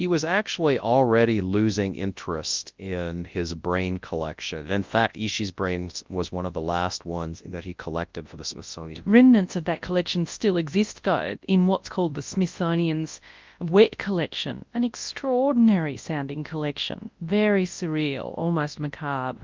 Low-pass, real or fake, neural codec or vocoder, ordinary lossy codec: 7.2 kHz; fake; codec, 24 kHz, 0.9 kbps, WavTokenizer, large speech release; Opus, 24 kbps